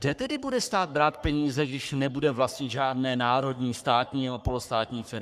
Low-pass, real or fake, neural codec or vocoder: 14.4 kHz; fake; codec, 44.1 kHz, 3.4 kbps, Pupu-Codec